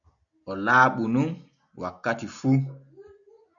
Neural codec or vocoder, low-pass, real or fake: none; 7.2 kHz; real